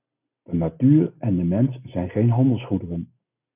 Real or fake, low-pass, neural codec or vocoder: real; 3.6 kHz; none